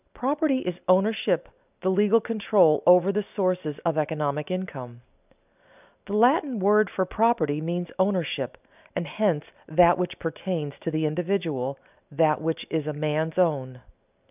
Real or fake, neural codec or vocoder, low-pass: real; none; 3.6 kHz